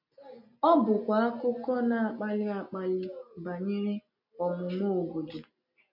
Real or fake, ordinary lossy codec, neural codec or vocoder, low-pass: real; none; none; 5.4 kHz